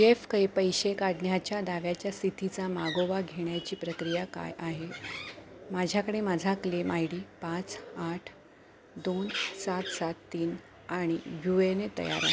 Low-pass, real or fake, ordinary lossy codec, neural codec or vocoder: none; real; none; none